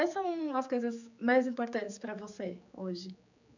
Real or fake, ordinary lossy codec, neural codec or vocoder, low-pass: fake; none; codec, 16 kHz, 4 kbps, X-Codec, HuBERT features, trained on general audio; 7.2 kHz